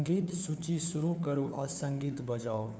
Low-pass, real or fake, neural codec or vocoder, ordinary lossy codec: none; fake; codec, 16 kHz, 4 kbps, FunCodec, trained on LibriTTS, 50 frames a second; none